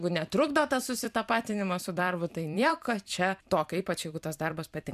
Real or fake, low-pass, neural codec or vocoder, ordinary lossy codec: fake; 14.4 kHz; vocoder, 44.1 kHz, 128 mel bands every 256 samples, BigVGAN v2; MP3, 96 kbps